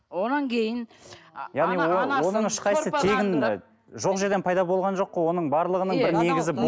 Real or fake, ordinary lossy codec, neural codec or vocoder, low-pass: real; none; none; none